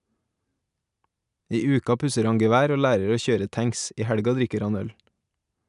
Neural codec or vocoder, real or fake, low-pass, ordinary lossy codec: none; real; 10.8 kHz; none